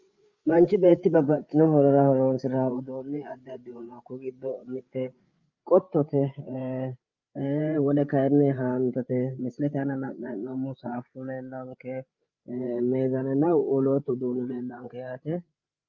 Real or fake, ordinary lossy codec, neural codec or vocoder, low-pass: fake; Opus, 32 kbps; codec, 16 kHz, 8 kbps, FreqCodec, larger model; 7.2 kHz